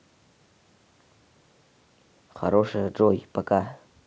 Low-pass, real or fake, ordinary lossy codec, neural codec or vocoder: none; real; none; none